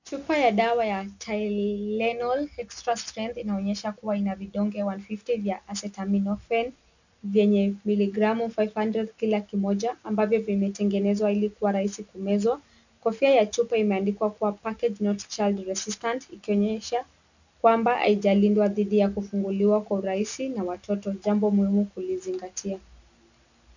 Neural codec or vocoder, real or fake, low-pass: none; real; 7.2 kHz